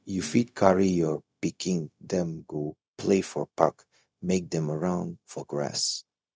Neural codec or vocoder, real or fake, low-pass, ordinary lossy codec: codec, 16 kHz, 0.4 kbps, LongCat-Audio-Codec; fake; none; none